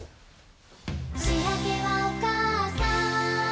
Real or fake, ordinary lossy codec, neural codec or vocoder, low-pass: real; none; none; none